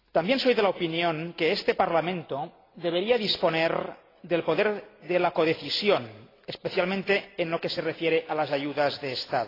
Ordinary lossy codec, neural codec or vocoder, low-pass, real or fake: AAC, 24 kbps; none; 5.4 kHz; real